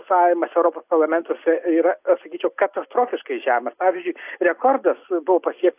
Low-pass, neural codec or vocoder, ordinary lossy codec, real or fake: 3.6 kHz; none; AAC, 32 kbps; real